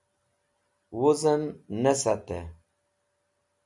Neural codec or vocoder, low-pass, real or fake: none; 10.8 kHz; real